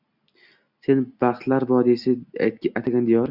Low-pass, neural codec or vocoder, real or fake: 5.4 kHz; none; real